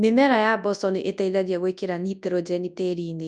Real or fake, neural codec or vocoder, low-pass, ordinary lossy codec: fake; codec, 24 kHz, 0.9 kbps, WavTokenizer, large speech release; 10.8 kHz; none